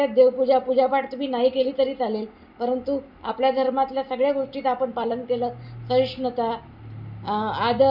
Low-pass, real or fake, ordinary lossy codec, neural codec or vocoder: 5.4 kHz; real; none; none